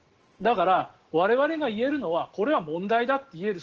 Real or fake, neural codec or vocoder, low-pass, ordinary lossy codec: real; none; 7.2 kHz; Opus, 16 kbps